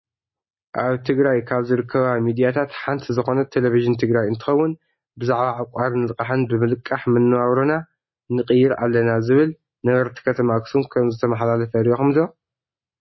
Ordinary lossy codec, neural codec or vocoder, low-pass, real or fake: MP3, 24 kbps; none; 7.2 kHz; real